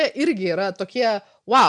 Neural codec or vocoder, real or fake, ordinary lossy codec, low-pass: none; real; MP3, 96 kbps; 10.8 kHz